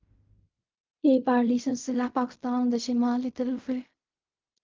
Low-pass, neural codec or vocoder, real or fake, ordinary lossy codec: 7.2 kHz; codec, 16 kHz in and 24 kHz out, 0.4 kbps, LongCat-Audio-Codec, fine tuned four codebook decoder; fake; Opus, 24 kbps